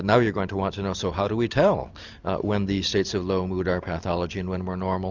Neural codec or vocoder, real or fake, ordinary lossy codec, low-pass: none; real; Opus, 64 kbps; 7.2 kHz